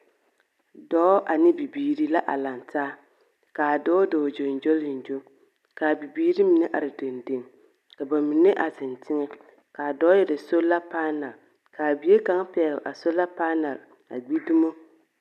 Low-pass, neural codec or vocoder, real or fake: 14.4 kHz; none; real